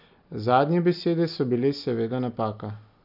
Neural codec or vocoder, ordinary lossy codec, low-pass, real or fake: none; none; 5.4 kHz; real